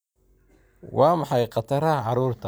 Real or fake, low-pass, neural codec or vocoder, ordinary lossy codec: real; none; none; none